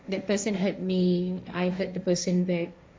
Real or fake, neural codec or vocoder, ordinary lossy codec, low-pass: fake; codec, 16 kHz, 1.1 kbps, Voila-Tokenizer; none; none